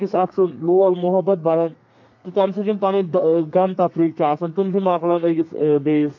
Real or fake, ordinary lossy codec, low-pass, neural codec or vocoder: fake; MP3, 48 kbps; 7.2 kHz; codec, 32 kHz, 1.9 kbps, SNAC